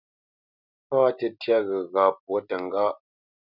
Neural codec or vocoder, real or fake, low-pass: none; real; 5.4 kHz